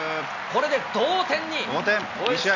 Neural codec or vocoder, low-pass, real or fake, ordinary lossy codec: none; 7.2 kHz; real; none